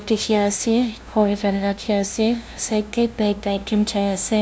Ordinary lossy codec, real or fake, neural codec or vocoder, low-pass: none; fake; codec, 16 kHz, 0.5 kbps, FunCodec, trained on LibriTTS, 25 frames a second; none